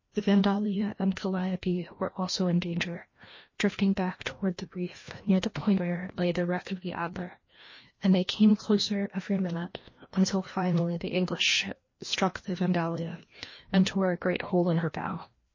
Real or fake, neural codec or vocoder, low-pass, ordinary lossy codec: fake; codec, 16 kHz, 1 kbps, FreqCodec, larger model; 7.2 kHz; MP3, 32 kbps